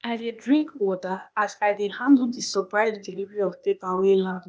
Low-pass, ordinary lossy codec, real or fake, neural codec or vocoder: none; none; fake; codec, 16 kHz, 0.8 kbps, ZipCodec